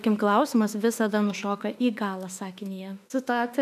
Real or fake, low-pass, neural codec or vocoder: fake; 14.4 kHz; autoencoder, 48 kHz, 32 numbers a frame, DAC-VAE, trained on Japanese speech